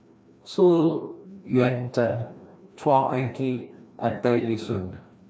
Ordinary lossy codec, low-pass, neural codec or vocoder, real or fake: none; none; codec, 16 kHz, 1 kbps, FreqCodec, larger model; fake